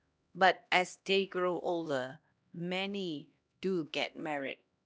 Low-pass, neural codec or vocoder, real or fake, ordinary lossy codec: none; codec, 16 kHz, 1 kbps, X-Codec, HuBERT features, trained on LibriSpeech; fake; none